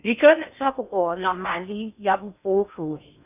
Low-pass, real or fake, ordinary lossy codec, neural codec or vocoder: 3.6 kHz; fake; none; codec, 16 kHz in and 24 kHz out, 0.6 kbps, FocalCodec, streaming, 4096 codes